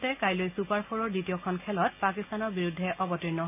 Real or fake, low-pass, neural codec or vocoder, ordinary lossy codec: real; 3.6 kHz; none; none